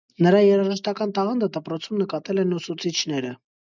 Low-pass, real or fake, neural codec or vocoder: 7.2 kHz; real; none